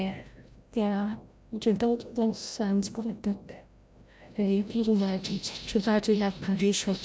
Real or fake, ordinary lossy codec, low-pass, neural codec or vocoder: fake; none; none; codec, 16 kHz, 0.5 kbps, FreqCodec, larger model